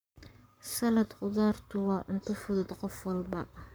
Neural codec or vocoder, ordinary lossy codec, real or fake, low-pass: codec, 44.1 kHz, 7.8 kbps, Pupu-Codec; none; fake; none